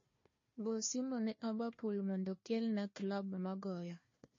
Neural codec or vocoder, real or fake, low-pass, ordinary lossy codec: codec, 16 kHz, 1 kbps, FunCodec, trained on Chinese and English, 50 frames a second; fake; 7.2 kHz; MP3, 32 kbps